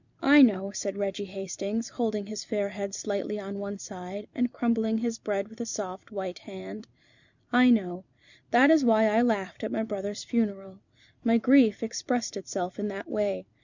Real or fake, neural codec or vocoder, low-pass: fake; vocoder, 44.1 kHz, 128 mel bands every 256 samples, BigVGAN v2; 7.2 kHz